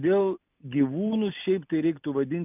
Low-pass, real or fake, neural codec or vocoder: 3.6 kHz; real; none